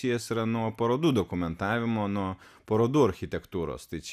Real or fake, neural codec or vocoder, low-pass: real; none; 14.4 kHz